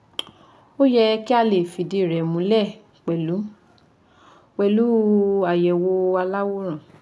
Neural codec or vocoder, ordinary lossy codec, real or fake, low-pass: none; none; real; none